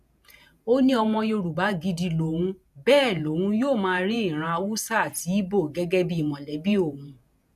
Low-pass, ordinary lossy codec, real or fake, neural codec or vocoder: 14.4 kHz; none; fake; vocoder, 48 kHz, 128 mel bands, Vocos